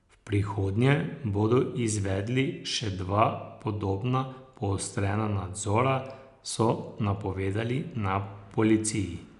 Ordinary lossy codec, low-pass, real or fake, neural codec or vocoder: none; 10.8 kHz; real; none